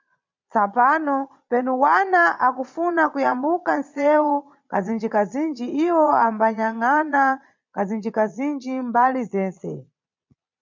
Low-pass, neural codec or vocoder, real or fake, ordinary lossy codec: 7.2 kHz; vocoder, 22.05 kHz, 80 mel bands, Vocos; fake; MP3, 64 kbps